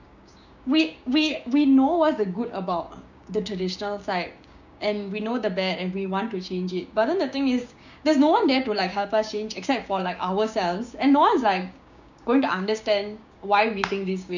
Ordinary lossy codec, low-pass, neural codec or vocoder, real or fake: none; 7.2 kHz; codec, 16 kHz, 6 kbps, DAC; fake